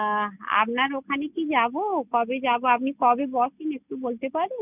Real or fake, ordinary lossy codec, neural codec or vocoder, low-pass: real; none; none; 3.6 kHz